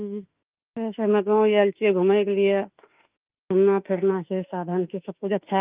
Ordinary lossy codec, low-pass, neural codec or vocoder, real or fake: Opus, 24 kbps; 3.6 kHz; autoencoder, 48 kHz, 32 numbers a frame, DAC-VAE, trained on Japanese speech; fake